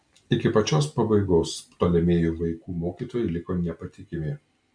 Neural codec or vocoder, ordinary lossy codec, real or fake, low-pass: none; MP3, 64 kbps; real; 9.9 kHz